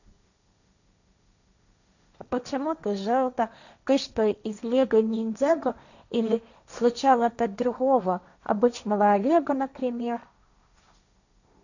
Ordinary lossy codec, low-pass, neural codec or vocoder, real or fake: none; 7.2 kHz; codec, 16 kHz, 1.1 kbps, Voila-Tokenizer; fake